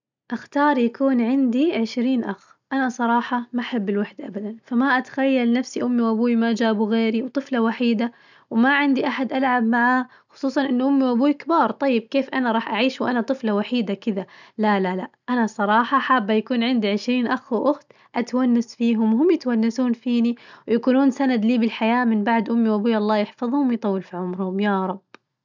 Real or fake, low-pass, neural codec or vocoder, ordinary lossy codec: real; 7.2 kHz; none; none